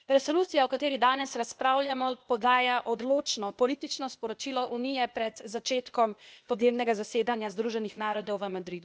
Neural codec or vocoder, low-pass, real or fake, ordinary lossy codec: codec, 16 kHz, 0.8 kbps, ZipCodec; none; fake; none